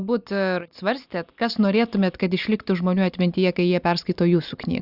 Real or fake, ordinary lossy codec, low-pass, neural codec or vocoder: real; Opus, 64 kbps; 5.4 kHz; none